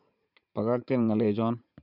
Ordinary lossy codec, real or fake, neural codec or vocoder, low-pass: none; fake; vocoder, 44.1 kHz, 80 mel bands, Vocos; 5.4 kHz